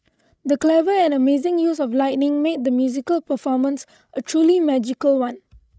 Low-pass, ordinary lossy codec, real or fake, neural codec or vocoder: none; none; fake; codec, 16 kHz, 16 kbps, FreqCodec, larger model